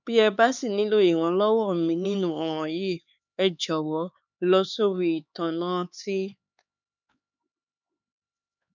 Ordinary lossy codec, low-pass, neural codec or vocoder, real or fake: none; 7.2 kHz; codec, 16 kHz, 4 kbps, X-Codec, HuBERT features, trained on LibriSpeech; fake